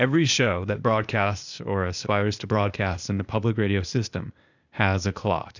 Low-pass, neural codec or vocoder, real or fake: 7.2 kHz; codec, 16 kHz, 0.8 kbps, ZipCodec; fake